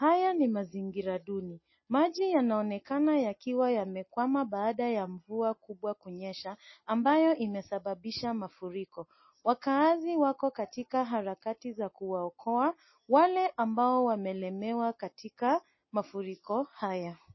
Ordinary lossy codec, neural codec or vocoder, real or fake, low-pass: MP3, 24 kbps; none; real; 7.2 kHz